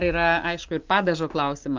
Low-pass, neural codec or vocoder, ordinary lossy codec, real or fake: 7.2 kHz; none; Opus, 24 kbps; real